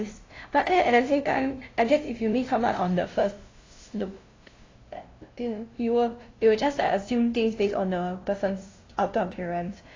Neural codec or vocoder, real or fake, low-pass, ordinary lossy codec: codec, 16 kHz, 0.5 kbps, FunCodec, trained on LibriTTS, 25 frames a second; fake; 7.2 kHz; AAC, 32 kbps